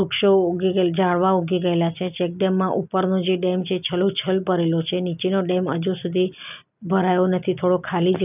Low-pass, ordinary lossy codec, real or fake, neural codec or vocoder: 3.6 kHz; none; real; none